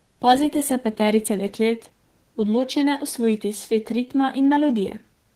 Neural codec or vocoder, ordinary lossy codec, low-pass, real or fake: codec, 32 kHz, 1.9 kbps, SNAC; Opus, 16 kbps; 14.4 kHz; fake